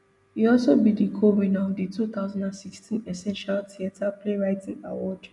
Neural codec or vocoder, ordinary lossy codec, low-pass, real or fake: none; none; 10.8 kHz; real